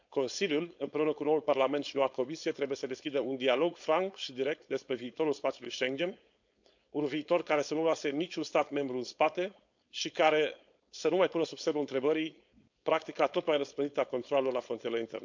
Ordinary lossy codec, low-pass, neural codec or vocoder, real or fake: MP3, 64 kbps; 7.2 kHz; codec, 16 kHz, 4.8 kbps, FACodec; fake